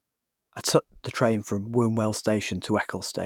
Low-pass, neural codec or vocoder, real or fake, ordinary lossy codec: 19.8 kHz; codec, 44.1 kHz, 7.8 kbps, DAC; fake; none